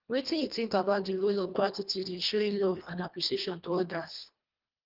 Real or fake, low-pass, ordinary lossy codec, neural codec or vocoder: fake; 5.4 kHz; Opus, 24 kbps; codec, 24 kHz, 1.5 kbps, HILCodec